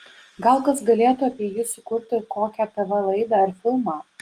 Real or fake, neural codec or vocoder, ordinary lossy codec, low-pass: real; none; Opus, 24 kbps; 14.4 kHz